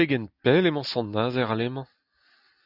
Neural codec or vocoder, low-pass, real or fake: none; 5.4 kHz; real